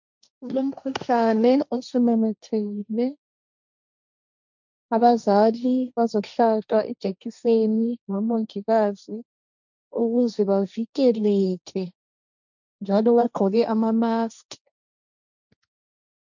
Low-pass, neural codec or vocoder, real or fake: 7.2 kHz; codec, 16 kHz, 1.1 kbps, Voila-Tokenizer; fake